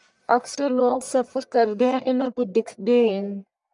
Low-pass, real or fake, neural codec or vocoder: 10.8 kHz; fake; codec, 44.1 kHz, 1.7 kbps, Pupu-Codec